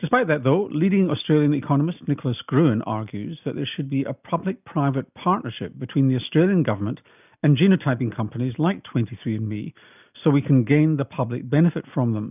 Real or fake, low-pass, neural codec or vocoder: real; 3.6 kHz; none